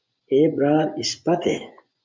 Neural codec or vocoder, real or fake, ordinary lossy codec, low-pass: vocoder, 24 kHz, 100 mel bands, Vocos; fake; MP3, 64 kbps; 7.2 kHz